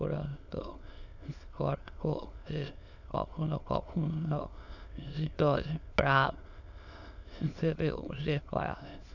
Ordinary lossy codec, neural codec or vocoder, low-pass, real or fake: none; autoencoder, 22.05 kHz, a latent of 192 numbers a frame, VITS, trained on many speakers; 7.2 kHz; fake